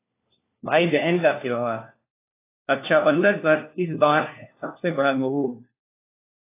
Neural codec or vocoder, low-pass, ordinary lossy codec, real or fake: codec, 16 kHz, 1 kbps, FunCodec, trained on LibriTTS, 50 frames a second; 3.6 kHz; AAC, 24 kbps; fake